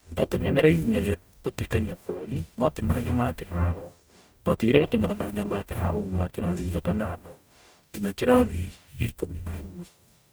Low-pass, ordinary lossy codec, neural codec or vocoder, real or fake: none; none; codec, 44.1 kHz, 0.9 kbps, DAC; fake